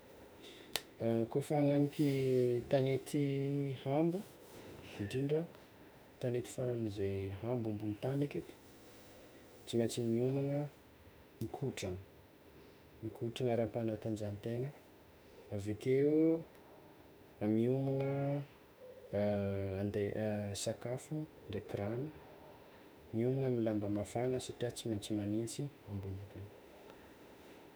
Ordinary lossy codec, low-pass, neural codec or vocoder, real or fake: none; none; autoencoder, 48 kHz, 32 numbers a frame, DAC-VAE, trained on Japanese speech; fake